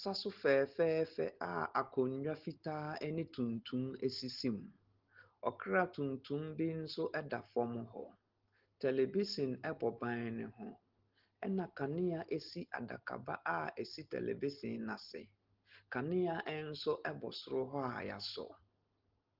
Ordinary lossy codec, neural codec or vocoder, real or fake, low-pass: Opus, 16 kbps; none; real; 5.4 kHz